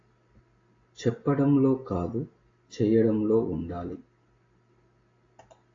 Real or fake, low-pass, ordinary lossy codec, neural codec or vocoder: real; 7.2 kHz; AAC, 32 kbps; none